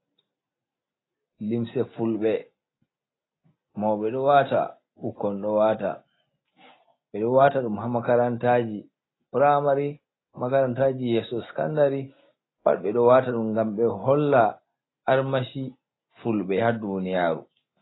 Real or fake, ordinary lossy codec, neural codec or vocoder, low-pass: real; AAC, 16 kbps; none; 7.2 kHz